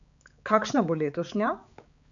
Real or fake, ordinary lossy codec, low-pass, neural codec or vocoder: fake; none; 7.2 kHz; codec, 16 kHz, 4 kbps, X-Codec, HuBERT features, trained on balanced general audio